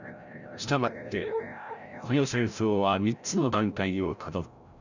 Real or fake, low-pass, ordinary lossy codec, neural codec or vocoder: fake; 7.2 kHz; none; codec, 16 kHz, 0.5 kbps, FreqCodec, larger model